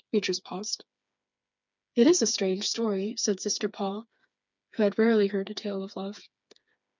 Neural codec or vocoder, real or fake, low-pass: codec, 16 kHz, 4 kbps, FreqCodec, smaller model; fake; 7.2 kHz